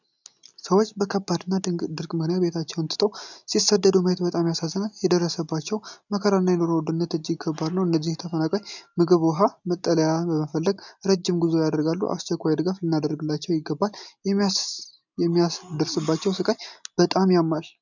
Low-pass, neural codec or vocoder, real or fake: 7.2 kHz; none; real